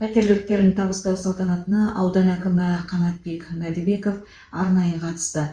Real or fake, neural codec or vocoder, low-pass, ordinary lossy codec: fake; codec, 16 kHz in and 24 kHz out, 2.2 kbps, FireRedTTS-2 codec; 9.9 kHz; Opus, 64 kbps